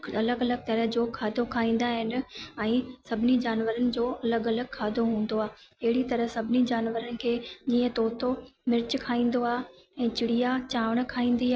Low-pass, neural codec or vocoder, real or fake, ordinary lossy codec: none; none; real; none